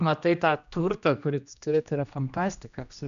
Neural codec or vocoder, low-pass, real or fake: codec, 16 kHz, 1 kbps, X-Codec, HuBERT features, trained on general audio; 7.2 kHz; fake